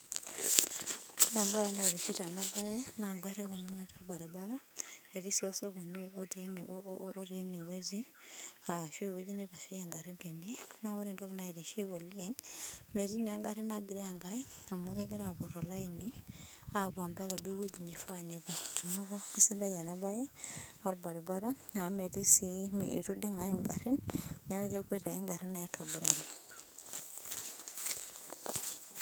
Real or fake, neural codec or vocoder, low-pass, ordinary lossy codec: fake; codec, 44.1 kHz, 2.6 kbps, SNAC; none; none